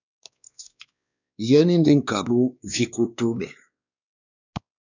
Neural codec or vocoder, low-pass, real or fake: codec, 16 kHz, 2 kbps, X-Codec, WavLM features, trained on Multilingual LibriSpeech; 7.2 kHz; fake